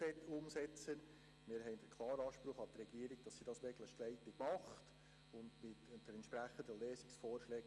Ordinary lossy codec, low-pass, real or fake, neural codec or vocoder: Opus, 32 kbps; 10.8 kHz; real; none